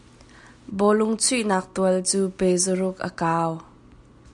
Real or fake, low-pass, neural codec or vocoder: real; 10.8 kHz; none